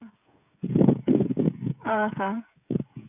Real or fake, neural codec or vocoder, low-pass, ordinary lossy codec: fake; codec, 16 kHz, 16 kbps, FreqCodec, smaller model; 3.6 kHz; none